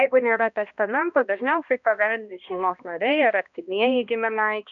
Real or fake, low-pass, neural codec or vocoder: fake; 7.2 kHz; codec, 16 kHz, 1 kbps, X-Codec, HuBERT features, trained on balanced general audio